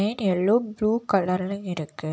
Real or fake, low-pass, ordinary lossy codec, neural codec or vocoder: real; none; none; none